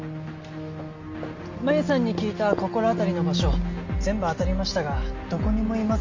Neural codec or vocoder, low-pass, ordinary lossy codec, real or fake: none; 7.2 kHz; MP3, 48 kbps; real